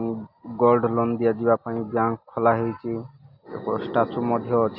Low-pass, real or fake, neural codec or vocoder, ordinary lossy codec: 5.4 kHz; real; none; Opus, 64 kbps